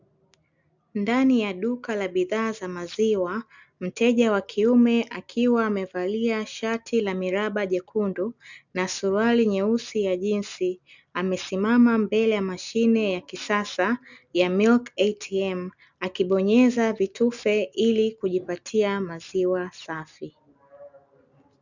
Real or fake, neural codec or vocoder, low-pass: real; none; 7.2 kHz